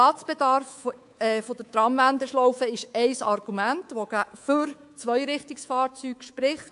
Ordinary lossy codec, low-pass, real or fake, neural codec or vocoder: AAC, 64 kbps; 10.8 kHz; fake; codec, 24 kHz, 3.1 kbps, DualCodec